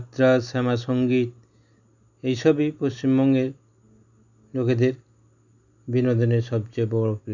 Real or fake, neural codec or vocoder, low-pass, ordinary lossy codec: real; none; 7.2 kHz; none